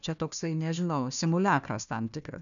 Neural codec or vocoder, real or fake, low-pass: codec, 16 kHz, 1 kbps, FunCodec, trained on Chinese and English, 50 frames a second; fake; 7.2 kHz